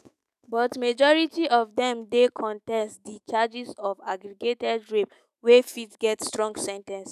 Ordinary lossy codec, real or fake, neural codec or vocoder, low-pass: none; fake; autoencoder, 48 kHz, 128 numbers a frame, DAC-VAE, trained on Japanese speech; 14.4 kHz